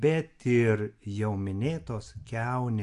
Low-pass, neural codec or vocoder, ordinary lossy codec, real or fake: 10.8 kHz; none; AAC, 64 kbps; real